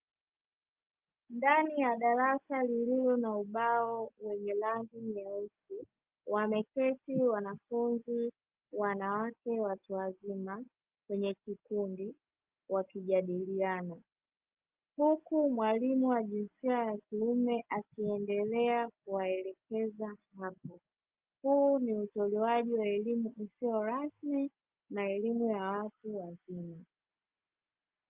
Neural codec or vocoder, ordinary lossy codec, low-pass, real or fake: none; Opus, 32 kbps; 3.6 kHz; real